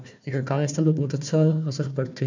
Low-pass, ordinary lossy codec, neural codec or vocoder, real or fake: 7.2 kHz; MP3, 64 kbps; codec, 16 kHz, 1 kbps, FunCodec, trained on Chinese and English, 50 frames a second; fake